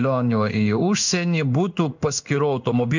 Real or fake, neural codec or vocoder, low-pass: fake; codec, 16 kHz in and 24 kHz out, 1 kbps, XY-Tokenizer; 7.2 kHz